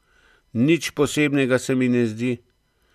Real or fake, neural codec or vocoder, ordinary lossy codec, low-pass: real; none; none; 14.4 kHz